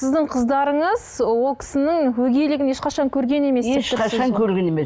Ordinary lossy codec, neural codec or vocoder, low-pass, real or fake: none; none; none; real